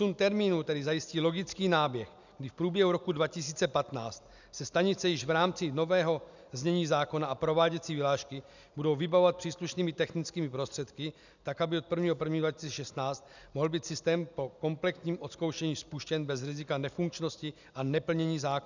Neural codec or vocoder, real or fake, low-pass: none; real; 7.2 kHz